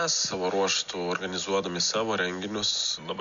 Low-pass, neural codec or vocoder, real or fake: 7.2 kHz; none; real